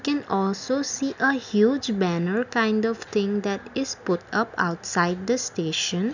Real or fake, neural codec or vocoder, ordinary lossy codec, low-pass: real; none; none; 7.2 kHz